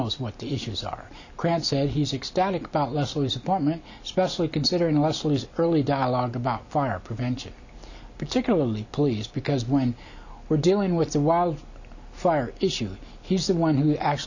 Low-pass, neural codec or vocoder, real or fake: 7.2 kHz; none; real